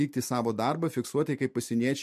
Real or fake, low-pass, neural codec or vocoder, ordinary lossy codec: real; 14.4 kHz; none; MP3, 64 kbps